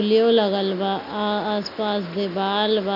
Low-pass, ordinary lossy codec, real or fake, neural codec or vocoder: 5.4 kHz; none; real; none